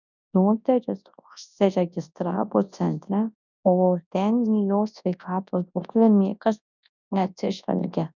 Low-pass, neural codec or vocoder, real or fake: 7.2 kHz; codec, 24 kHz, 0.9 kbps, WavTokenizer, large speech release; fake